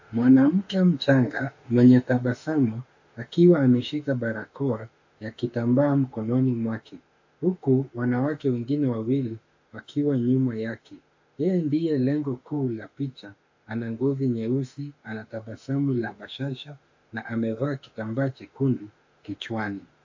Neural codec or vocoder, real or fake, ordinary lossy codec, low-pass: autoencoder, 48 kHz, 32 numbers a frame, DAC-VAE, trained on Japanese speech; fake; AAC, 48 kbps; 7.2 kHz